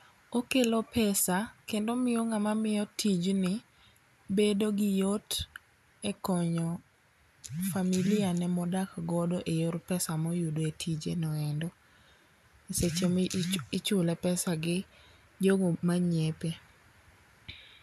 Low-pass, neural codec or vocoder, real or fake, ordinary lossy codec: 14.4 kHz; none; real; none